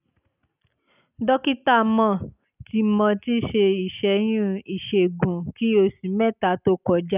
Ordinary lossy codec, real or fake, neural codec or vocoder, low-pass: none; real; none; 3.6 kHz